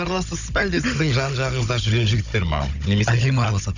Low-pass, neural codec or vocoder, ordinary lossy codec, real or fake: 7.2 kHz; codec, 16 kHz, 16 kbps, FunCodec, trained on Chinese and English, 50 frames a second; none; fake